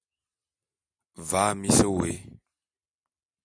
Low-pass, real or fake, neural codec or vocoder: 9.9 kHz; real; none